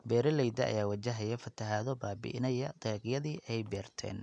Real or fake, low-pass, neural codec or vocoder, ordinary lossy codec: real; 9.9 kHz; none; none